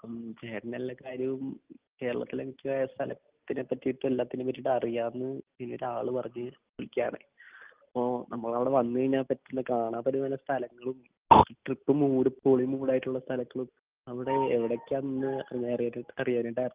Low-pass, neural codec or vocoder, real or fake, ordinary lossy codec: 3.6 kHz; none; real; Opus, 32 kbps